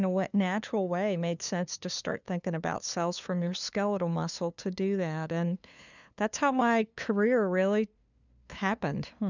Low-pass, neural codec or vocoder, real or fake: 7.2 kHz; codec, 16 kHz, 2 kbps, FunCodec, trained on LibriTTS, 25 frames a second; fake